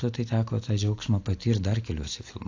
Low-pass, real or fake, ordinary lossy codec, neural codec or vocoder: 7.2 kHz; real; AAC, 48 kbps; none